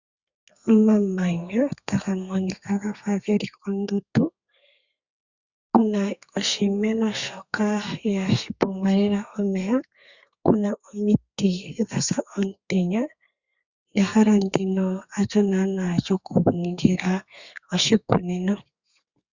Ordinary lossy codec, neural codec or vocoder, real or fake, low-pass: Opus, 64 kbps; codec, 32 kHz, 1.9 kbps, SNAC; fake; 7.2 kHz